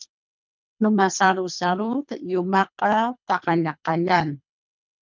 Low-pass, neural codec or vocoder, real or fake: 7.2 kHz; codec, 24 kHz, 3 kbps, HILCodec; fake